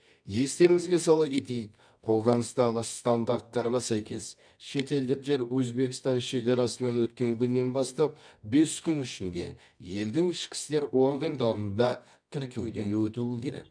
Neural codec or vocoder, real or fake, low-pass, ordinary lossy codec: codec, 24 kHz, 0.9 kbps, WavTokenizer, medium music audio release; fake; 9.9 kHz; none